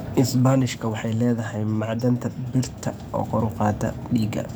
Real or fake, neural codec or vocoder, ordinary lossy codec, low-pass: fake; codec, 44.1 kHz, 7.8 kbps, DAC; none; none